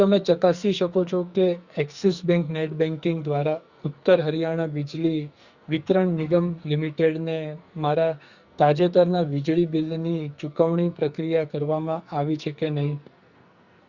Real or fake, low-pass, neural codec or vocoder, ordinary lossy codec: fake; 7.2 kHz; codec, 44.1 kHz, 2.6 kbps, SNAC; Opus, 64 kbps